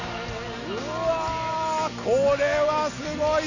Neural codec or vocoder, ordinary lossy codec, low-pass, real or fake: none; none; 7.2 kHz; real